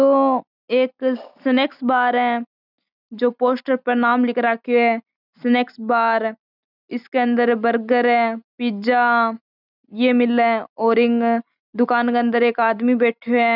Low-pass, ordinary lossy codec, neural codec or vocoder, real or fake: 5.4 kHz; none; none; real